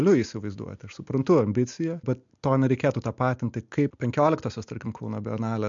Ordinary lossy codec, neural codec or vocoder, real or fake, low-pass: MP3, 64 kbps; none; real; 7.2 kHz